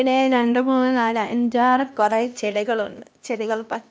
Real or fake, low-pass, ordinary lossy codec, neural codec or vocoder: fake; none; none; codec, 16 kHz, 1 kbps, X-Codec, HuBERT features, trained on LibriSpeech